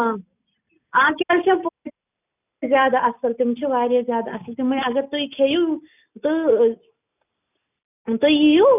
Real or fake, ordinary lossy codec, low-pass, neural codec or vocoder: real; none; 3.6 kHz; none